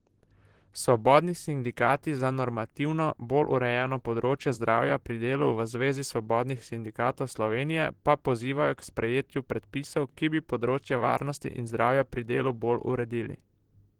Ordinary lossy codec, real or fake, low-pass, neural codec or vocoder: Opus, 16 kbps; fake; 19.8 kHz; vocoder, 44.1 kHz, 128 mel bands, Pupu-Vocoder